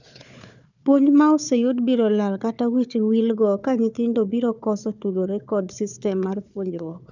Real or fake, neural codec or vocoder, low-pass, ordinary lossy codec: fake; codec, 16 kHz, 4 kbps, FunCodec, trained on Chinese and English, 50 frames a second; 7.2 kHz; none